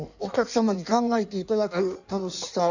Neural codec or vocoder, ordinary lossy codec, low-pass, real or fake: codec, 16 kHz in and 24 kHz out, 1.1 kbps, FireRedTTS-2 codec; none; 7.2 kHz; fake